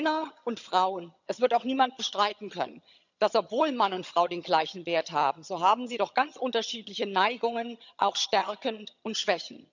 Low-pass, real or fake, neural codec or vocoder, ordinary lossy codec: 7.2 kHz; fake; vocoder, 22.05 kHz, 80 mel bands, HiFi-GAN; none